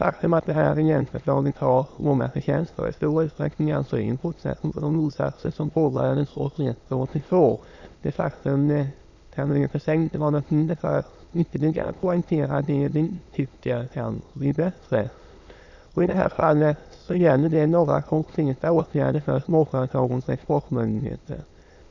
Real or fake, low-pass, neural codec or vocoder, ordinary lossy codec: fake; 7.2 kHz; autoencoder, 22.05 kHz, a latent of 192 numbers a frame, VITS, trained on many speakers; none